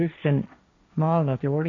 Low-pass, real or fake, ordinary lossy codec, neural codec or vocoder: 7.2 kHz; fake; none; codec, 16 kHz, 1.1 kbps, Voila-Tokenizer